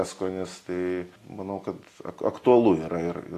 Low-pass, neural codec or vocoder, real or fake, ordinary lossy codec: 14.4 kHz; none; real; AAC, 48 kbps